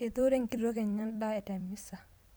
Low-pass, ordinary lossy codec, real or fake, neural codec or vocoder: none; none; fake; vocoder, 44.1 kHz, 128 mel bands every 256 samples, BigVGAN v2